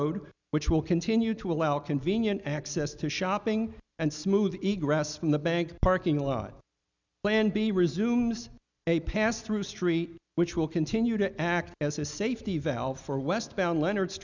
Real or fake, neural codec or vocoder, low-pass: real; none; 7.2 kHz